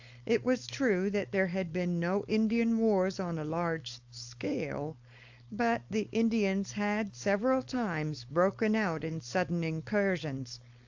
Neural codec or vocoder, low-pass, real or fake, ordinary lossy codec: codec, 16 kHz, 4.8 kbps, FACodec; 7.2 kHz; fake; AAC, 48 kbps